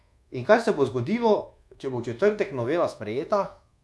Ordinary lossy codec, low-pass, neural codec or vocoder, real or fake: none; none; codec, 24 kHz, 1.2 kbps, DualCodec; fake